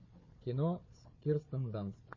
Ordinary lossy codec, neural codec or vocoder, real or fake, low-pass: MP3, 32 kbps; codec, 16 kHz, 4 kbps, FunCodec, trained on Chinese and English, 50 frames a second; fake; 7.2 kHz